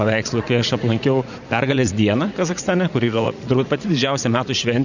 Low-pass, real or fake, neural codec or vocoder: 7.2 kHz; fake; vocoder, 44.1 kHz, 80 mel bands, Vocos